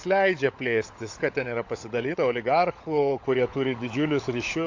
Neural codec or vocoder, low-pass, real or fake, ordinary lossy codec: codec, 16 kHz, 16 kbps, FunCodec, trained on LibriTTS, 50 frames a second; 7.2 kHz; fake; AAC, 48 kbps